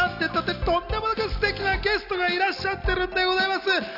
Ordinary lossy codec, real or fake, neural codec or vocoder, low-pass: none; real; none; 5.4 kHz